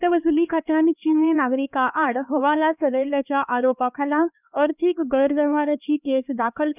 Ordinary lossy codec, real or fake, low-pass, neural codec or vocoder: none; fake; 3.6 kHz; codec, 16 kHz, 2 kbps, X-Codec, HuBERT features, trained on LibriSpeech